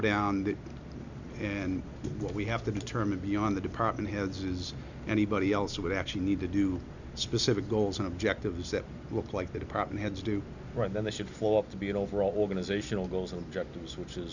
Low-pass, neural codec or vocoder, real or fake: 7.2 kHz; none; real